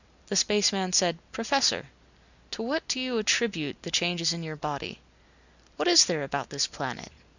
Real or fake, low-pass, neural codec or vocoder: real; 7.2 kHz; none